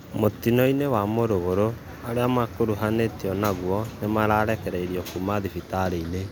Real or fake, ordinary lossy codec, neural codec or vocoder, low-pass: real; none; none; none